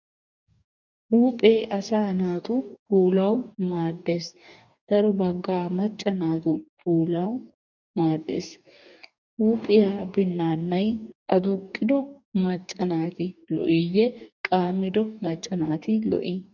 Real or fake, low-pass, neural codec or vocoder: fake; 7.2 kHz; codec, 44.1 kHz, 2.6 kbps, DAC